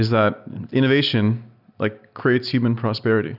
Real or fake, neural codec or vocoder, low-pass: real; none; 5.4 kHz